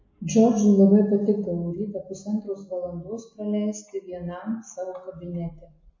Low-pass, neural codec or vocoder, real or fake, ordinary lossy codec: 7.2 kHz; none; real; MP3, 32 kbps